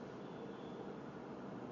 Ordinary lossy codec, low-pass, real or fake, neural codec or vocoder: MP3, 48 kbps; 7.2 kHz; real; none